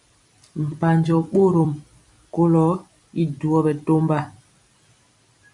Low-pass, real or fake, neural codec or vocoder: 10.8 kHz; fake; vocoder, 44.1 kHz, 128 mel bands every 256 samples, BigVGAN v2